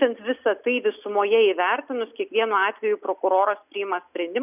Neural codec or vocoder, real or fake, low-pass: none; real; 3.6 kHz